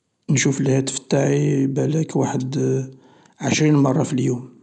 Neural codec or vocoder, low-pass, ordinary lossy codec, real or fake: none; 10.8 kHz; none; real